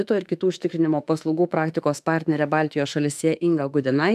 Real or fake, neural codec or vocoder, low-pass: fake; autoencoder, 48 kHz, 32 numbers a frame, DAC-VAE, trained on Japanese speech; 14.4 kHz